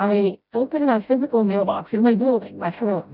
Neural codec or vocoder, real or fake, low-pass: codec, 16 kHz, 0.5 kbps, FreqCodec, smaller model; fake; 5.4 kHz